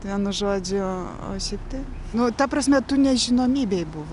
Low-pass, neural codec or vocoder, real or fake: 10.8 kHz; none; real